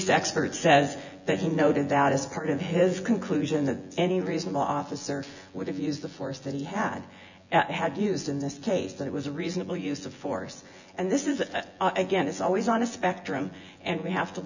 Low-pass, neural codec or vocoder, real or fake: 7.2 kHz; vocoder, 24 kHz, 100 mel bands, Vocos; fake